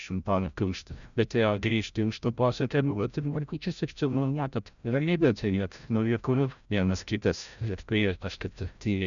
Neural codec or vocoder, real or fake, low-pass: codec, 16 kHz, 0.5 kbps, FreqCodec, larger model; fake; 7.2 kHz